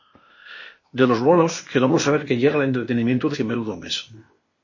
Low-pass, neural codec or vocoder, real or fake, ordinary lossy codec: 7.2 kHz; codec, 16 kHz, 0.8 kbps, ZipCodec; fake; MP3, 32 kbps